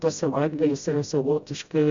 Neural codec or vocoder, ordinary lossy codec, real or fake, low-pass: codec, 16 kHz, 0.5 kbps, FreqCodec, smaller model; Opus, 64 kbps; fake; 7.2 kHz